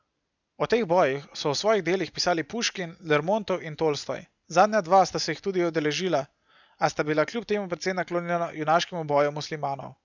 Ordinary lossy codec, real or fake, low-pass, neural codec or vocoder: none; real; 7.2 kHz; none